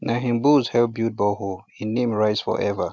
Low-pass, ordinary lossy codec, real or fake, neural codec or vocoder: none; none; real; none